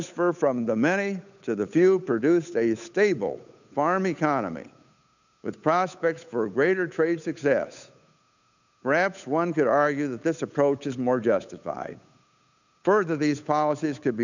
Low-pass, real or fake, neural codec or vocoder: 7.2 kHz; fake; codec, 16 kHz, 8 kbps, FunCodec, trained on Chinese and English, 25 frames a second